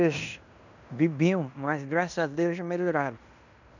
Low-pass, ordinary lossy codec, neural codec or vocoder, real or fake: 7.2 kHz; none; codec, 16 kHz in and 24 kHz out, 0.9 kbps, LongCat-Audio-Codec, fine tuned four codebook decoder; fake